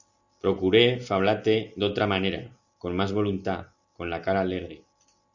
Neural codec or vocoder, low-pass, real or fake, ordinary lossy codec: none; 7.2 kHz; real; Opus, 64 kbps